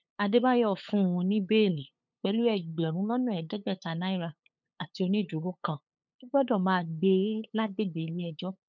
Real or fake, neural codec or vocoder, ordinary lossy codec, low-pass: fake; codec, 16 kHz, 8 kbps, FunCodec, trained on LibriTTS, 25 frames a second; none; 7.2 kHz